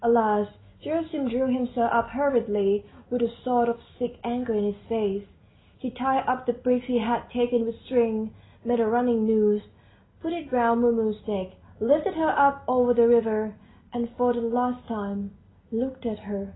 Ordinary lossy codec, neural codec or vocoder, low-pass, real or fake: AAC, 16 kbps; none; 7.2 kHz; real